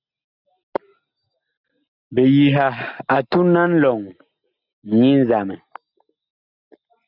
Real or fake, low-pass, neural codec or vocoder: real; 5.4 kHz; none